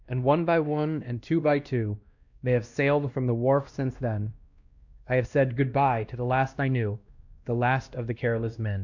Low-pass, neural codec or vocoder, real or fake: 7.2 kHz; codec, 16 kHz, 1 kbps, X-Codec, WavLM features, trained on Multilingual LibriSpeech; fake